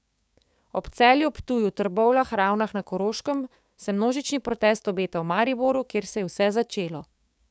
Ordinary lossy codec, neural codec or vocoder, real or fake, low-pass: none; codec, 16 kHz, 6 kbps, DAC; fake; none